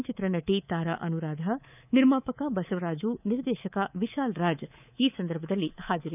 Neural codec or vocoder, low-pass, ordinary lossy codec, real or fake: codec, 24 kHz, 3.1 kbps, DualCodec; 3.6 kHz; none; fake